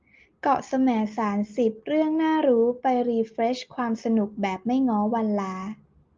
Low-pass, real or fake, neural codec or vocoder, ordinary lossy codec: 7.2 kHz; real; none; Opus, 24 kbps